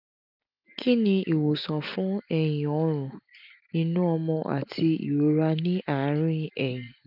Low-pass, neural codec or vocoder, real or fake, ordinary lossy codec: 5.4 kHz; none; real; none